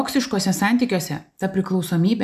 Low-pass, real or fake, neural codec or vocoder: 14.4 kHz; real; none